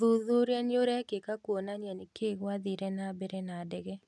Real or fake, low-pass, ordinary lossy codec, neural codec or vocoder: real; none; none; none